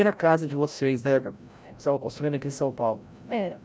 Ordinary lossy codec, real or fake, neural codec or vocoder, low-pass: none; fake; codec, 16 kHz, 0.5 kbps, FreqCodec, larger model; none